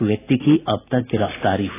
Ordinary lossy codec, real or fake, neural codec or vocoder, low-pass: AAC, 16 kbps; real; none; 3.6 kHz